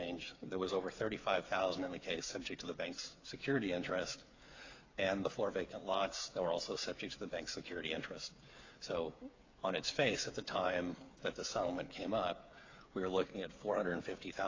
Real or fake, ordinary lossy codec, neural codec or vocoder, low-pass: fake; AAC, 32 kbps; codec, 16 kHz, 8 kbps, FreqCodec, smaller model; 7.2 kHz